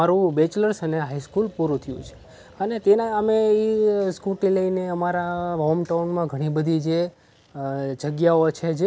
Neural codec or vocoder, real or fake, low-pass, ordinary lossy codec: none; real; none; none